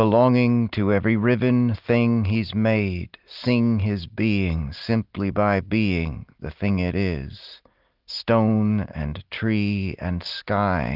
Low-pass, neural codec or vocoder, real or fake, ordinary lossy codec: 5.4 kHz; none; real; Opus, 32 kbps